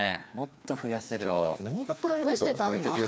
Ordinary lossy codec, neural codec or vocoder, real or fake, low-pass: none; codec, 16 kHz, 2 kbps, FreqCodec, larger model; fake; none